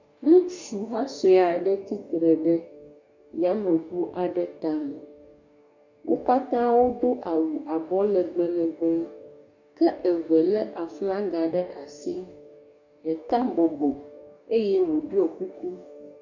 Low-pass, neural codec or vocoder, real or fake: 7.2 kHz; codec, 44.1 kHz, 2.6 kbps, DAC; fake